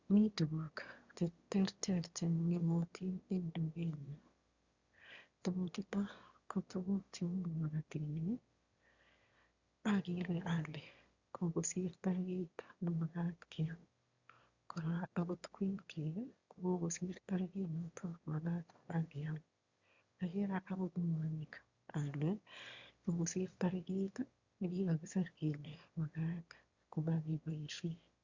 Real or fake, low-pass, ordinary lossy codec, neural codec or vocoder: fake; 7.2 kHz; Opus, 64 kbps; autoencoder, 22.05 kHz, a latent of 192 numbers a frame, VITS, trained on one speaker